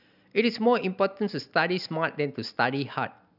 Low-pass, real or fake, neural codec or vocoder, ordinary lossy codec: 5.4 kHz; real; none; none